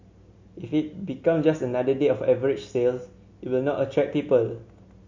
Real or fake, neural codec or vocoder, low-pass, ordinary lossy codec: real; none; 7.2 kHz; MP3, 48 kbps